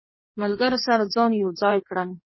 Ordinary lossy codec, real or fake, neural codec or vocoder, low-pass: MP3, 24 kbps; fake; codec, 16 kHz in and 24 kHz out, 1.1 kbps, FireRedTTS-2 codec; 7.2 kHz